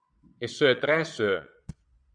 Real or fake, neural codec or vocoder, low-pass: fake; vocoder, 22.05 kHz, 80 mel bands, Vocos; 9.9 kHz